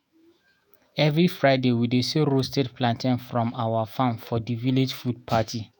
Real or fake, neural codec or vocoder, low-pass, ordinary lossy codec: fake; autoencoder, 48 kHz, 128 numbers a frame, DAC-VAE, trained on Japanese speech; none; none